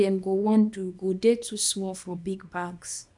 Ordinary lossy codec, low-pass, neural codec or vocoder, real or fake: none; 10.8 kHz; codec, 24 kHz, 0.9 kbps, WavTokenizer, small release; fake